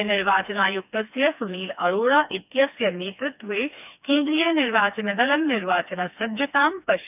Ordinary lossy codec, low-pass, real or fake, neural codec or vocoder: none; 3.6 kHz; fake; codec, 16 kHz, 2 kbps, FreqCodec, smaller model